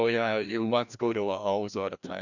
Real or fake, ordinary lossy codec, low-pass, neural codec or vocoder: fake; none; 7.2 kHz; codec, 16 kHz, 1 kbps, FreqCodec, larger model